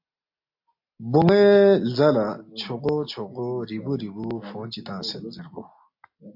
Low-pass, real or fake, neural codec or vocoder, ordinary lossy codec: 5.4 kHz; real; none; AAC, 48 kbps